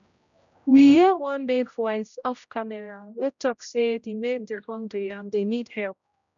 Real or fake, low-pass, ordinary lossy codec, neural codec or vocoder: fake; 7.2 kHz; none; codec, 16 kHz, 0.5 kbps, X-Codec, HuBERT features, trained on general audio